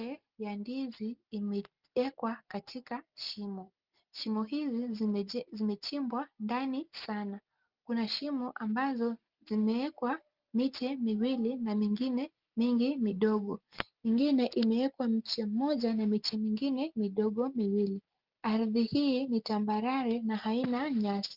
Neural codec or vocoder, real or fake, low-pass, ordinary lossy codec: none; real; 5.4 kHz; Opus, 32 kbps